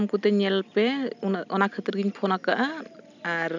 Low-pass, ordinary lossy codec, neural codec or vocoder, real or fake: 7.2 kHz; none; none; real